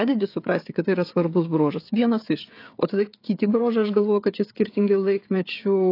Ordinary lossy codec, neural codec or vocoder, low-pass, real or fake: AAC, 32 kbps; codec, 16 kHz, 16 kbps, FreqCodec, smaller model; 5.4 kHz; fake